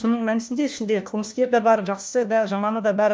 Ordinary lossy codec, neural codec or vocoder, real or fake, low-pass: none; codec, 16 kHz, 1 kbps, FunCodec, trained on LibriTTS, 50 frames a second; fake; none